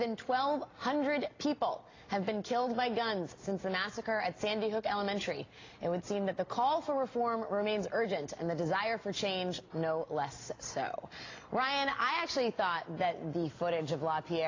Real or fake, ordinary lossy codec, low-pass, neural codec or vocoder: real; AAC, 32 kbps; 7.2 kHz; none